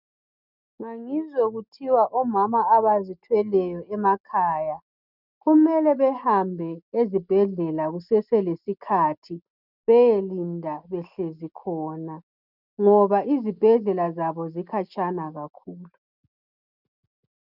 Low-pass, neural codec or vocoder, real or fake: 5.4 kHz; none; real